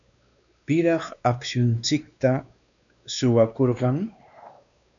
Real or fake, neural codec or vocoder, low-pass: fake; codec, 16 kHz, 2 kbps, X-Codec, WavLM features, trained on Multilingual LibriSpeech; 7.2 kHz